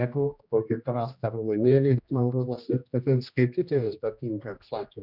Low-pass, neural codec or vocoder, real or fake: 5.4 kHz; codec, 16 kHz, 1 kbps, X-Codec, HuBERT features, trained on general audio; fake